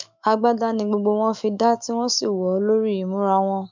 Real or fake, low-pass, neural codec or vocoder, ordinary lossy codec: fake; 7.2 kHz; autoencoder, 48 kHz, 128 numbers a frame, DAC-VAE, trained on Japanese speech; MP3, 64 kbps